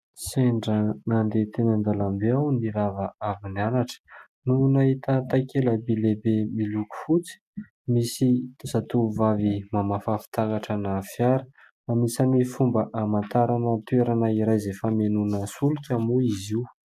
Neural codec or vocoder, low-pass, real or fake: none; 14.4 kHz; real